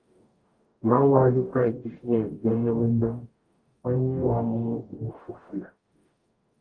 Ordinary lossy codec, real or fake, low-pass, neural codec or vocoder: Opus, 32 kbps; fake; 9.9 kHz; codec, 44.1 kHz, 0.9 kbps, DAC